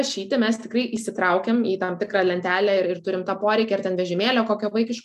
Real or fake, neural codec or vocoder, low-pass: real; none; 14.4 kHz